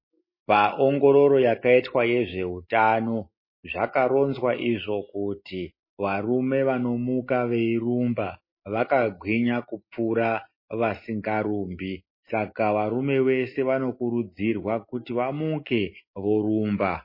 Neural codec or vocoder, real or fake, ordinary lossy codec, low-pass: none; real; MP3, 24 kbps; 5.4 kHz